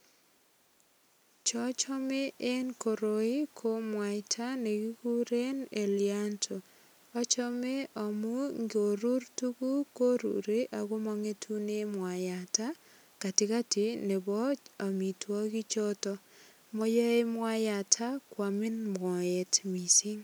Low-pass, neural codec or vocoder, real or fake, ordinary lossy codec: none; none; real; none